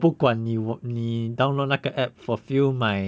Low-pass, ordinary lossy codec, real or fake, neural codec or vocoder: none; none; real; none